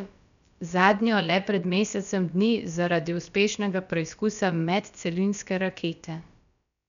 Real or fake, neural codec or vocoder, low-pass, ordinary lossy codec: fake; codec, 16 kHz, about 1 kbps, DyCAST, with the encoder's durations; 7.2 kHz; none